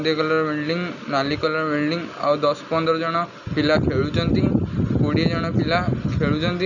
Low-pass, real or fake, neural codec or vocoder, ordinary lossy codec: 7.2 kHz; real; none; none